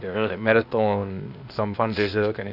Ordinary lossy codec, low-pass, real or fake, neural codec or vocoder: none; 5.4 kHz; fake; codec, 16 kHz, 0.8 kbps, ZipCodec